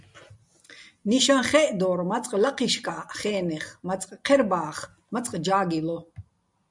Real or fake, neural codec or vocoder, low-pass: real; none; 10.8 kHz